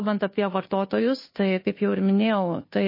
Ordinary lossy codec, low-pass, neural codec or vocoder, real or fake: MP3, 24 kbps; 5.4 kHz; codec, 16 kHz, 2 kbps, FunCodec, trained on Chinese and English, 25 frames a second; fake